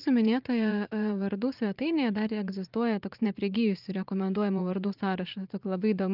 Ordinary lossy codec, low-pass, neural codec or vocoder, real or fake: Opus, 24 kbps; 5.4 kHz; vocoder, 44.1 kHz, 80 mel bands, Vocos; fake